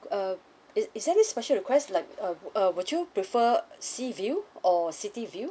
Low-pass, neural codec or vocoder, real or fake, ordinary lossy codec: none; none; real; none